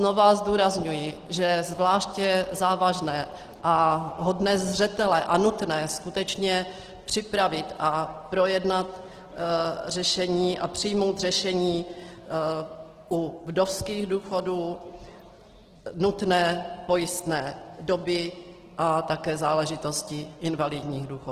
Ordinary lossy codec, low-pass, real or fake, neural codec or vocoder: Opus, 16 kbps; 14.4 kHz; real; none